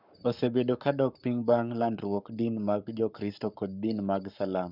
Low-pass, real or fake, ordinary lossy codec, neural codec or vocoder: 5.4 kHz; fake; none; codec, 44.1 kHz, 7.8 kbps, Pupu-Codec